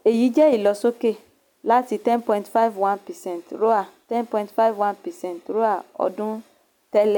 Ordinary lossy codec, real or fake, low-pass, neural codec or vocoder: none; real; 19.8 kHz; none